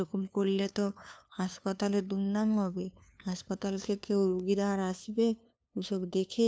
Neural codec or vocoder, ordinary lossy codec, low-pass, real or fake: codec, 16 kHz, 2 kbps, FunCodec, trained on LibriTTS, 25 frames a second; none; none; fake